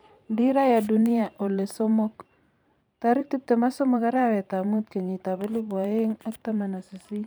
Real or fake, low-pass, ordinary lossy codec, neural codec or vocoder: fake; none; none; vocoder, 44.1 kHz, 128 mel bands every 512 samples, BigVGAN v2